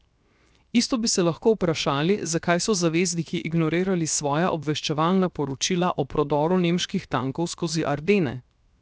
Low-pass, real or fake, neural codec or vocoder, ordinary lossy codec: none; fake; codec, 16 kHz, 0.7 kbps, FocalCodec; none